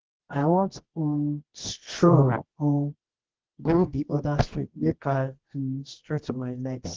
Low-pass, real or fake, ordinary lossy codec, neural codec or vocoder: 7.2 kHz; fake; Opus, 16 kbps; codec, 24 kHz, 0.9 kbps, WavTokenizer, medium music audio release